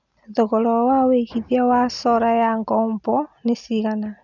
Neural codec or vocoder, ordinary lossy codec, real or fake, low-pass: none; none; real; 7.2 kHz